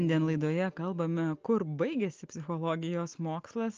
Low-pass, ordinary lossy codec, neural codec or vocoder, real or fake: 7.2 kHz; Opus, 24 kbps; none; real